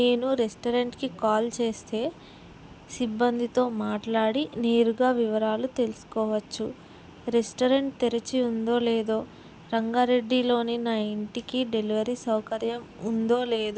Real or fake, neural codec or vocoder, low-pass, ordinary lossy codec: real; none; none; none